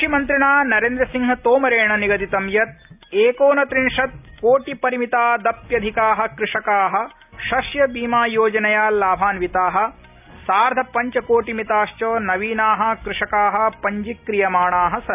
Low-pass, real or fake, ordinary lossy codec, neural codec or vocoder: 3.6 kHz; real; none; none